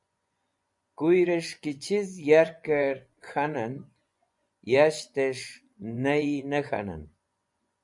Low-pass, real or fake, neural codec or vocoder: 10.8 kHz; fake; vocoder, 24 kHz, 100 mel bands, Vocos